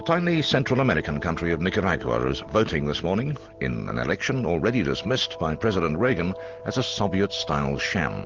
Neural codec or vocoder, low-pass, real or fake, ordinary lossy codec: none; 7.2 kHz; real; Opus, 16 kbps